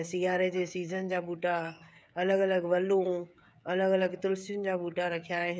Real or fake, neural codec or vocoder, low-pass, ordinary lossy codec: fake; codec, 16 kHz, 16 kbps, FreqCodec, smaller model; none; none